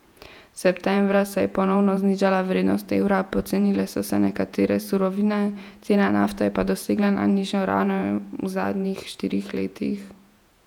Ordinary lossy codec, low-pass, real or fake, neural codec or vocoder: none; 19.8 kHz; fake; vocoder, 48 kHz, 128 mel bands, Vocos